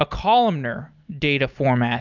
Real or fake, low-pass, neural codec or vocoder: real; 7.2 kHz; none